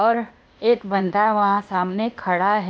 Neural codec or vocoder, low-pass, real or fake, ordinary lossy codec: codec, 16 kHz, 0.8 kbps, ZipCodec; none; fake; none